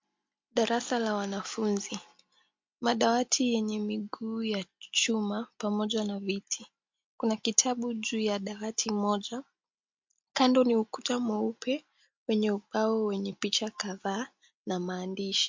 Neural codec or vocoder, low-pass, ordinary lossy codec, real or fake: none; 7.2 kHz; MP3, 48 kbps; real